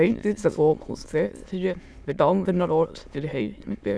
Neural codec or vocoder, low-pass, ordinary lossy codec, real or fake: autoencoder, 22.05 kHz, a latent of 192 numbers a frame, VITS, trained on many speakers; none; none; fake